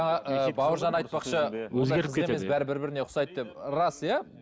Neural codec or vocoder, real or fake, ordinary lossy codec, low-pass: none; real; none; none